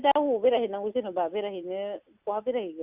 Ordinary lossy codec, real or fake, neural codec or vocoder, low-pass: Opus, 64 kbps; real; none; 3.6 kHz